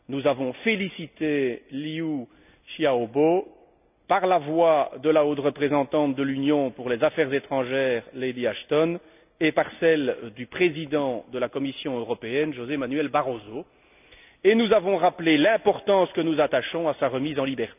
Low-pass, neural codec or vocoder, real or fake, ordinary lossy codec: 3.6 kHz; none; real; none